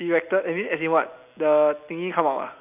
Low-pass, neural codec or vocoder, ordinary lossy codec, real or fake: 3.6 kHz; none; none; real